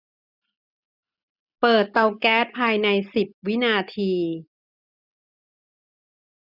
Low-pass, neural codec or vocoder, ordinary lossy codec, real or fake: 5.4 kHz; none; none; real